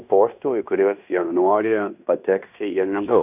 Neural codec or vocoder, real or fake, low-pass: codec, 16 kHz in and 24 kHz out, 0.9 kbps, LongCat-Audio-Codec, fine tuned four codebook decoder; fake; 3.6 kHz